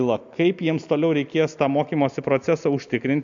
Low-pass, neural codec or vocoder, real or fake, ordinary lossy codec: 7.2 kHz; none; real; MP3, 64 kbps